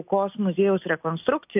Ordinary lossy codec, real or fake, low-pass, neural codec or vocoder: AAC, 32 kbps; real; 3.6 kHz; none